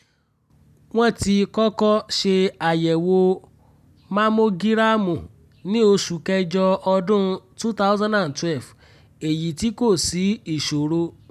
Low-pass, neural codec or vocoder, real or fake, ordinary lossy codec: 14.4 kHz; none; real; none